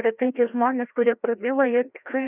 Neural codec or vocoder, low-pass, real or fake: codec, 16 kHz, 1 kbps, FreqCodec, larger model; 3.6 kHz; fake